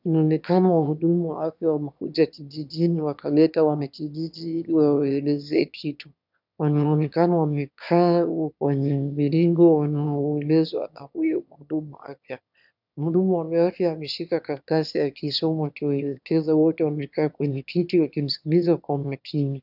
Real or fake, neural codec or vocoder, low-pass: fake; autoencoder, 22.05 kHz, a latent of 192 numbers a frame, VITS, trained on one speaker; 5.4 kHz